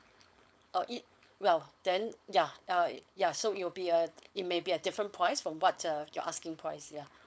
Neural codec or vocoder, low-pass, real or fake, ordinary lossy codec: codec, 16 kHz, 4.8 kbps, FACodec; none; fake; none